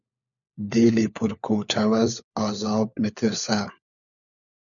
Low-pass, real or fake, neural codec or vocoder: 7.2 kHz; fake; codec, 16 kHz, 4 kbps, FunCodec, trained on LibriTTS, 50 frames a second